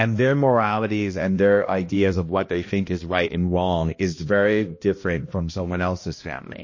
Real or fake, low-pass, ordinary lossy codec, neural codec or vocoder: fake; 7.2 kHz; MP3, 32 kbps; codec, 16 kHz, 1 kbps, X-Codec, HuBERT features, trained on balanced general audio